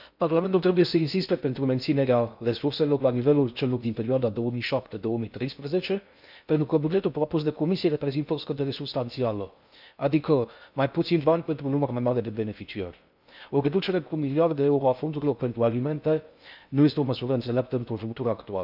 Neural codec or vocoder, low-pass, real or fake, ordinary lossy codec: codec, 16 kHz in and 24 kHz out, 0.6 kbps, FocalCodec, streaming, 2048 codes; 5.4 kHz; fake; none